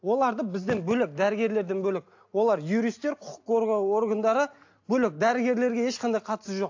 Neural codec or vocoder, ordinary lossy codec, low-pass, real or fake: none; AAC, 48 kbps; 7.2 kHz; real